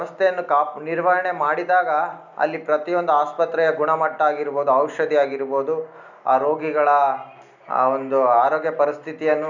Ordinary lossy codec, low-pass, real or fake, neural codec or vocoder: none; 7.2 kHz; real; none